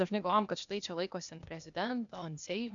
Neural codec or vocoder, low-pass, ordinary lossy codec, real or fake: codec, 16 kHz, 0.8 kbps, ZipCodec; 7.2 kHz; MP3, 96 kbps; fake